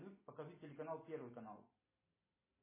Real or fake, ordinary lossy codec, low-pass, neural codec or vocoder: real; MP3, 16 kbps; 3.6 kHz; none